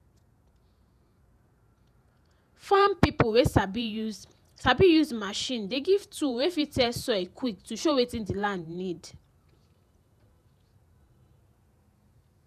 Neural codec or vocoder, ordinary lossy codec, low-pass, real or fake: vocoder, 48 kHz, 128 mel bands, Vocos; none; 14.4 kHz; fake